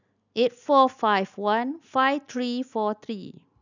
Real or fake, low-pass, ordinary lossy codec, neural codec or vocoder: real; 7.2 kHz; none; none